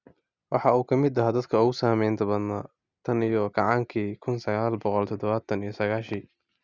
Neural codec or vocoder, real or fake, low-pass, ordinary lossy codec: none; real; 7.2 kHz; Opus, 64 kbps